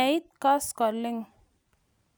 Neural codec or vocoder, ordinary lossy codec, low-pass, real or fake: none; none; none; real